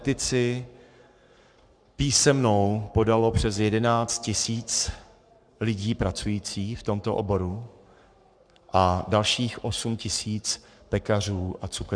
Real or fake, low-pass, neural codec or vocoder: fake; 9.9 kHz; codec, 44.1 kHz, 7.8 kbps, Pupu-Codec